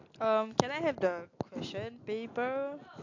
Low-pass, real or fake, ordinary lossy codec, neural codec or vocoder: 7.2 kHz; real; AAC, 48 kbps; none